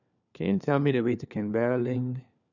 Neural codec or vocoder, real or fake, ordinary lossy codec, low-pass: codec, 16 kHz, 4 kbps, FunCodec, trained on LibriTTS, 50 frames a second; fake; none; 7.2 kHz